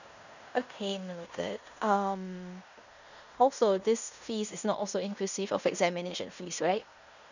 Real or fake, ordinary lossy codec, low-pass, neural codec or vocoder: fake; none; 7.2 kHz; codec, 16 kHz in and 24 kHz out, 0.9 kbps, LongCat-Audio-Codec, fine tuned four codebook decoder